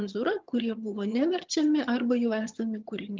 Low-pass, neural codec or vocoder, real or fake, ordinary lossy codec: 7.2 kHz; vocoder, 22.05 kHz, 80 mel bands, HiFi-GAN; fake; Opus, 32 kbps